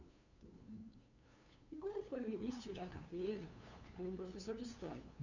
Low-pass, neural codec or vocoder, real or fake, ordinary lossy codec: 7.2 kHz; codec, 16 kHz, 2 kbps, FunCodec, trained on LibriTTS, 25 frames a second; fake; none